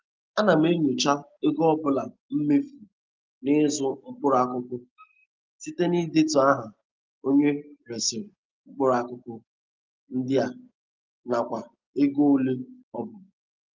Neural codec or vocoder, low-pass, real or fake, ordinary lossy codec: none; 7.2 kHz; real; Opus, 32 kbps